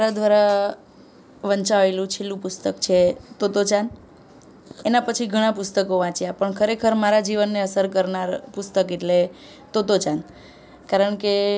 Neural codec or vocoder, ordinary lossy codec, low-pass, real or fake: none; none; none; real